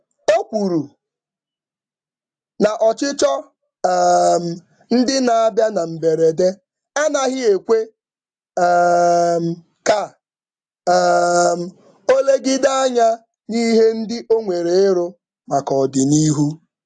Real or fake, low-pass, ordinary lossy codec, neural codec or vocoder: real; 9.9 kHz; AAC, 64 kbps; none